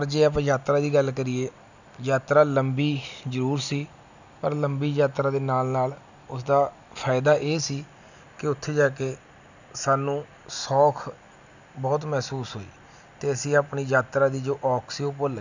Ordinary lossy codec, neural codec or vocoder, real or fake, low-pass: none; none; real; 7.2 kHz